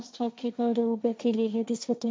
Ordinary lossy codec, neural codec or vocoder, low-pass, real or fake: none; codec, 16 kHz, 1.1 kbps, Voila-Tokenizer; none; fake